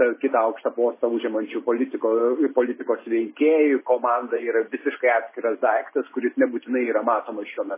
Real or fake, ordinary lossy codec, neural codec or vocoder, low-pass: real; MP3, 16 kbps; none; 3.6 kHz